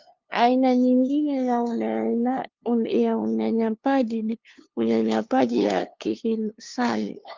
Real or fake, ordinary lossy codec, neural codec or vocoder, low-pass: fake; Opus, 24 kbps; codec, 16 kHz, 2 kbps, FunCodec, trained on LibriTTS, 25 frames a second; 7.2 kHz